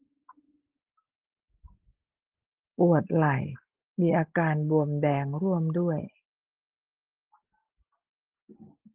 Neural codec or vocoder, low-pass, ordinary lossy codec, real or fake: none; 3.6 kHz; Opus, 16 kbps; real